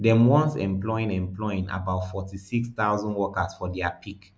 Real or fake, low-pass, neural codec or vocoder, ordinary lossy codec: real; none; none; none